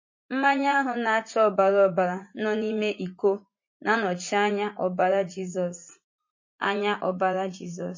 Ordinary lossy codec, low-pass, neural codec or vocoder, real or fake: MP3, 32 kbps; 7.2 kHz; vocoder, 44.1 kHz, 80 mel bands, Vocos; fake